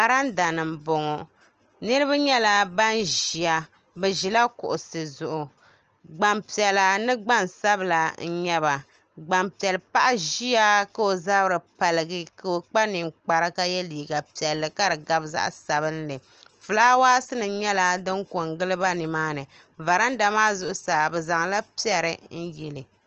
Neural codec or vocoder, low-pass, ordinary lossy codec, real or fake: none; 7.2 kHz; Opus, 24 kbps; real